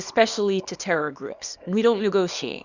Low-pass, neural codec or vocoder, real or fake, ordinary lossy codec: 7.2 kHz; codec, 24 kHz, 0.9 kbps, WavTokenizer, small release; fake; Opus, 64 kbps